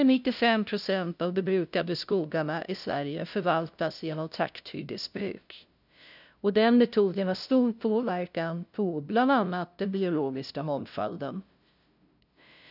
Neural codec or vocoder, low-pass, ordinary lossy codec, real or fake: codec, 16 kHz, 0.5 kbps, FunCodec, trained on LibriTTS, 25 frames a second; 5.4 kHz; none; fake